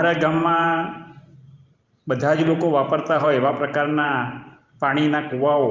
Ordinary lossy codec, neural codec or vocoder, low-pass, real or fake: Opus, 32 kbps; vocoder, 44.1 kHz, 128 mel bands every 512 samples, BigVGAN v2; 7.2 kHz; fake